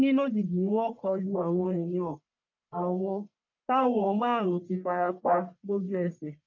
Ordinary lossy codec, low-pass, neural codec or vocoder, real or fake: none; 7.2 kHz; codec, 44.1 kHz, 1.7 kbps, Pupu-Codec; fake